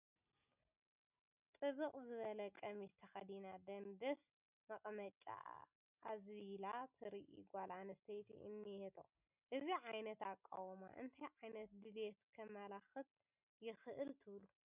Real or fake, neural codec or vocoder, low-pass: real; none; 3.6 kHz